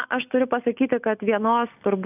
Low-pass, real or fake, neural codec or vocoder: 3.6 kHz; real; none